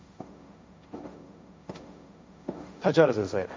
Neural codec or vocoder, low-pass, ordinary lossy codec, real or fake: codec, 16 kHz, 1.1 kbps, Voila-Tokenizer; none; none; fake